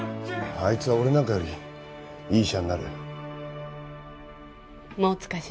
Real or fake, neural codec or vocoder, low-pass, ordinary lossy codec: real; none; none; none